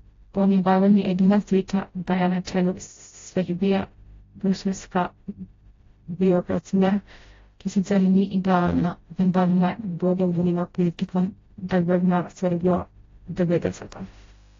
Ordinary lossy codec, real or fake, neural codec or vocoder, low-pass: AAC, 32 kbps; fake; codec, 16 kHz, 0.5 kbps, FreqCodec, smaller model; 7.2 kHz